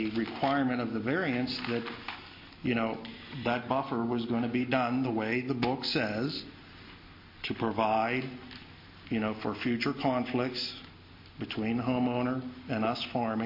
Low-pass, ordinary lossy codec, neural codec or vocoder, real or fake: 5.4 kHz; MP3, 32 kbps; none; real